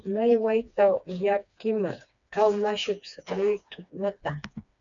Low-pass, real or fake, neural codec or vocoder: 7.2 kHz; fake; codec, 16 kHz, 2 kbps, FreqCodec, smaller model